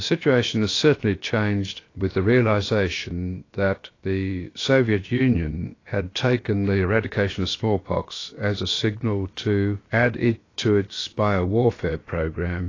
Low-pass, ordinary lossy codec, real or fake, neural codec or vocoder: 7.2 kHz; AAC, 32 kbps; fake; codec, 16 kHz, about 1 kbps, DyCAST, with the encoder's durations